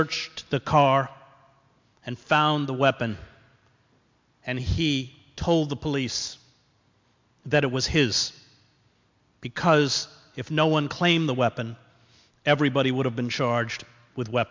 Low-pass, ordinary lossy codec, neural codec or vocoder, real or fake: 7.2 kHz; MP3, 64 kbps; none; real